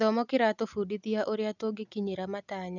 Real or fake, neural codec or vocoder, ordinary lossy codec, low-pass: real; none; none; 7.2 kHz